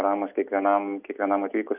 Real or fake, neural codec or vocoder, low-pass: real; none; 3.6 kHz